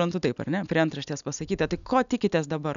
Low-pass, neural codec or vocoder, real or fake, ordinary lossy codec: 7.2 kHz; none; real; MP3, 96 kbps